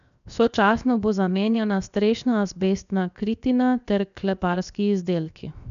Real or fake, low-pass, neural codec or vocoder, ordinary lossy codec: fake; 7.2 kHz; codec, 16 kHz, 0.7 kbps, FocalCodec; none